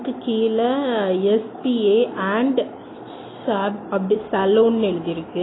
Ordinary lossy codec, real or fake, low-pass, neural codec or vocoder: AAC, 16 kbps; real; 7.2 kHz; none